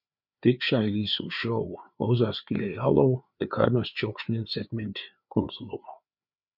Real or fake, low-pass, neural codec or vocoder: fake; 5.4 kHz; codec, 16 kHz, 4 kbps, FreqCodec, larger model